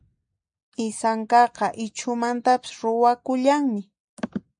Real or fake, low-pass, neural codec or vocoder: real; 9.9 kHz; none